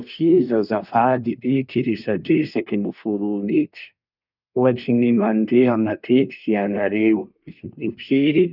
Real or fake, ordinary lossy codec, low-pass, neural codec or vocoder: fake; none; 5.4 kHz; codec, 24 kHz, 1 kbps, SNAC